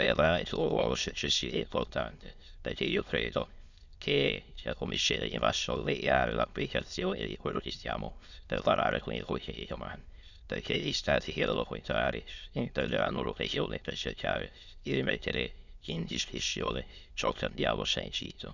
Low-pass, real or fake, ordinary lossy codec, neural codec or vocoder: 7.2 kHz; fake; none; autoencoder, 22.05 kHz, a latent of 192 numbers a frame, VITS, trained on many speakers